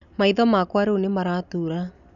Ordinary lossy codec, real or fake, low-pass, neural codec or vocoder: none; real; 7.2 kHz; none